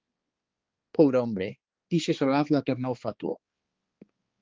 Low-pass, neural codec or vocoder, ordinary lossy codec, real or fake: 7.2 kHz; codec, 16 kHz, 2 kbps, X-Codec, HuBERT features, trained on balanced general audio; Opus, 32 kbps; fake